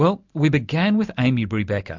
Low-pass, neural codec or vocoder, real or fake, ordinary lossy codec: 7.2 kHz; none; real; MP3, 64 kbps